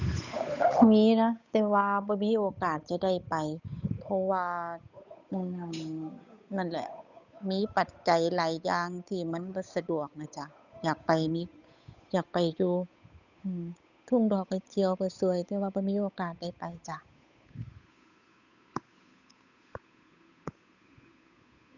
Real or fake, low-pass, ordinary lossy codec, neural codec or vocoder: fake; 7.2 kHz; none; codec, 16 kHz, 8 kbps, FunCodec, trained on Chinese and English, 25 frames a second